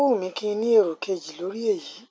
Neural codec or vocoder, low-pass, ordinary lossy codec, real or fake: none; none; none; real